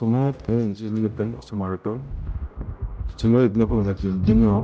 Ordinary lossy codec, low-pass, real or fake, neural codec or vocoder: none; none; fake; codec, 16 kHz, 0.5 kbps, X-Codec, HuBERT features, trained on general audio